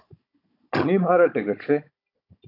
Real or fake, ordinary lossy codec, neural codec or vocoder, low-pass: fake; AAC, 32 kbps; codec, 16 kHz, 16 kbps, FunCodec, trained on Chinese and English, 50 frames a second; 5.4 kHz